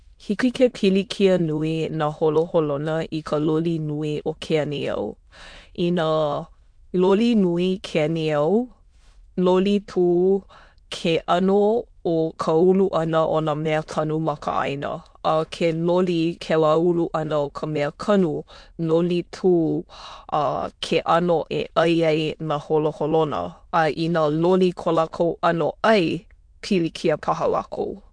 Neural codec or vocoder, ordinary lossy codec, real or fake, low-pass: autoencoder, 22.05 kHz, a latent of 192 numbers a frame, VITS, trained on many speakers; MP3, 64 kbps; fake; 9.9 kHz